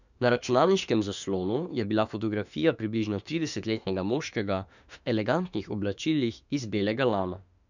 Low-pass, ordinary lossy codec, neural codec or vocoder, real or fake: 7.2 kHz; none; autoencoder, 48 kHz, 32 numbers a frame, DAC-VAE, trained on Japanese speech; fake